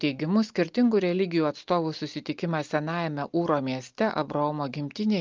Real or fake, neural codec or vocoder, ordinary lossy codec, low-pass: real; none; Opus, 32 kbps; 7.2 kHz